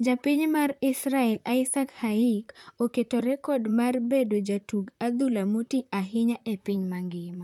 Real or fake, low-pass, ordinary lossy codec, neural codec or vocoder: fake; 19.8 kHz; none; vocoder, 44.1 kHz, 128 mel bands, Pupu-Vocoder